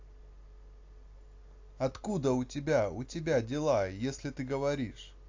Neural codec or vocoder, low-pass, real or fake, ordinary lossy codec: none; 7.2 kHz; real; AAC, 48 kbps